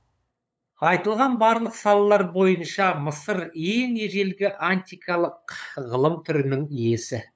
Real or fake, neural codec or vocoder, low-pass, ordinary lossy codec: fake; codec, 16 kHz, 8 kbps, FunCodec, trained on LibriTTS, 25 frames a second; none; none